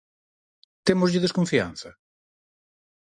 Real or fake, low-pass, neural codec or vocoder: real; 9.9 kHz; none